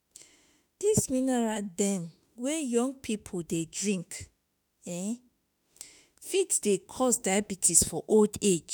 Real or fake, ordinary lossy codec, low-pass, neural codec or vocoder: fake; none; none; autoencoder, 48 kHz, 32 numbers a frame, DAC-VAE, trained on Japanese speech